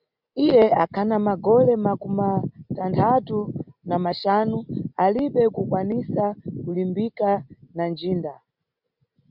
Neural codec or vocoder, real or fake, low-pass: none; real; 5.4 kHz